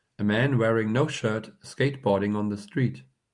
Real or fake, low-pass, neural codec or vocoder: real; 10.8 kHz; none